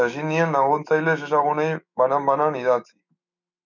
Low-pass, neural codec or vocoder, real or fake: 7.2 kHz; autoencoder, 48 kHz, 128 numbers a frame, DAC-VAE, trained on Japanese speech; fake